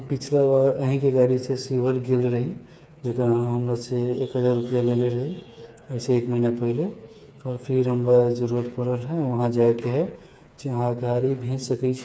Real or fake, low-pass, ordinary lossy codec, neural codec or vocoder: fake; none; none; codec, 16 kHz, 4 kbps, FreqCodec, smaller model